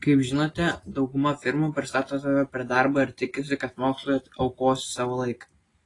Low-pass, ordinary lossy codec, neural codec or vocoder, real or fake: 10.8 kHz; AAC, 32 kbps; none; real